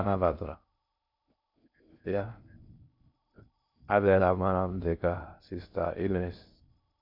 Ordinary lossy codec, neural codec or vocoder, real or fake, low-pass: none; codec, 16 kHz in and 24 kHz out, 0.6 kbps, FocalCodec, streaming, 2048 codes; fake; 5.4 kHz